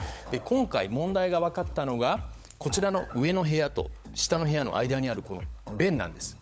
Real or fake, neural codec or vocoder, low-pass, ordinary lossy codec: fake; codec, 16 kHz, 16 kbps, FunCodec, trained on LibriTTS, 50 frames a second; none; none